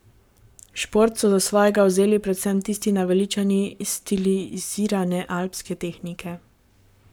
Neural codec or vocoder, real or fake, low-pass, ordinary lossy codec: codec, 44.1 kHz, 7.8 kbps, Pupu-Codec; fake; none; none